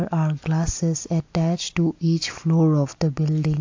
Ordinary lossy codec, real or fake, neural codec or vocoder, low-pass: AAC, 48 kbps; real; none; 7.2 kHz